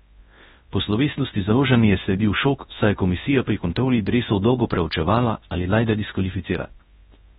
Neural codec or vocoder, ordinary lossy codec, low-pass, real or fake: codec, 24 kHz, 0.9 kbps, WavTokenizer, large speech release; AAC, 16 kbps; 10.8 kHz; fake